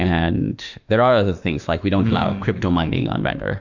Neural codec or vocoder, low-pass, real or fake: autoencoder, 48 kHz, 32 numbers a frame, DAC-VAE, trained on Japanese speech; 7.2 kHz; fake